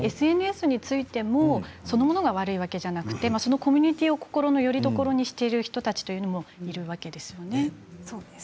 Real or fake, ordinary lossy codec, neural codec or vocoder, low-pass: real; none; none; none